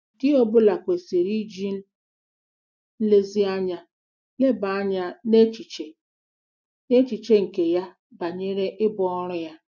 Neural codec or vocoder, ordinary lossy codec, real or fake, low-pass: none; none; real; 7.2 kHz